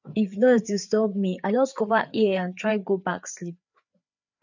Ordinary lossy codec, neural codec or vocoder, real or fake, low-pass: none; codec, 16 kHz, 4 kbps, FreqCodec, larger model; fake; 7.2 kHz